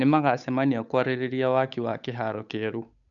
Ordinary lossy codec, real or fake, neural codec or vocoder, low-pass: none; fake; codec, 16 kHz, 8 kbps, FunCodec, trained on Chinese and English, 25 frames a second; 7.2 kHz